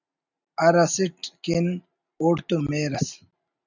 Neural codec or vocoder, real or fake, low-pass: none; real; 7.2 kHz